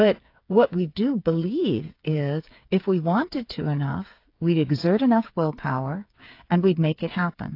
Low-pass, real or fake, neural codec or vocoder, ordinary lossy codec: 5.4 kHz; fake; codec, 16 kHz, 8 kbps, FreqCodec, smaller model; AAC, 32 kbps